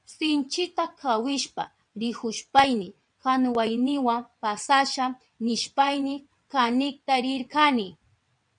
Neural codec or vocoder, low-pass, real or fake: vocoder, 22.05 kHz, 80 mel bands, WaveNeXt; 9.9 kHz; fake